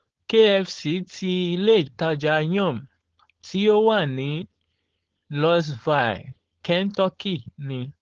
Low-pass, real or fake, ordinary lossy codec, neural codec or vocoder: 7.2 kHz; fake; Opus, 16 kbps; codec, 16 kHz, 4.8 kbps, FACodec